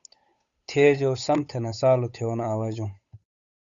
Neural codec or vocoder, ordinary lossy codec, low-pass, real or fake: codec, 16 kHz, 8 kbps, FunCodec, trained on Chinese and English, 25 frames a second; Opus, 64 kbps; 7.2 kHz; fake